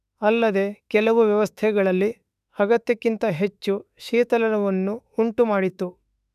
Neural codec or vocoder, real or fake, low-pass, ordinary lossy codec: autoencoder, 48 kHz, 32 numbers a frame, DAC-VAE, trained on Japanese speech; fake; 14.4 kHz; none